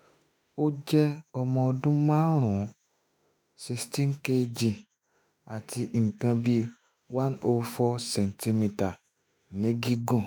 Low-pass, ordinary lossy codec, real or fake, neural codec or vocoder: none; none; fake; autoencoder, 48 kHz, 32 numbers a frame, DAC-VAE, trained on Japanese speech